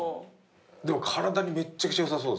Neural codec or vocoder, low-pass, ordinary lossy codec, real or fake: none; none; none; real